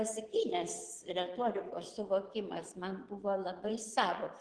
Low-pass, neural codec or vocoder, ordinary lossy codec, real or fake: 9.9 kHz; vocoder, 22.05 kHz, 80 mel bands, Vocos; Opus, 16 kbps; fake